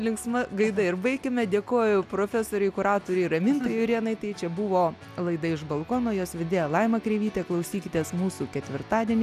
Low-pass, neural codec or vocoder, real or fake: 14.4 kHz; none; real